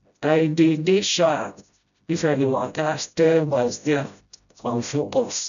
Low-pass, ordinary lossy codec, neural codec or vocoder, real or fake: 7.2 kHz; none; codec, 16 kHz, 0.5 kbps, FreqCodec, smaller model; fake